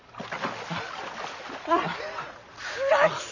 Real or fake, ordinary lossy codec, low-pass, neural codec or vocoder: real; none; 7.2 kHz; none